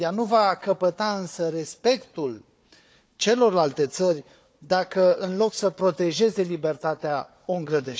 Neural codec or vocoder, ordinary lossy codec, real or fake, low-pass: codec, 16 kHz, 4 kbps, FunCodec, trained on Chinese and English, 50 frames a second; none; fake; none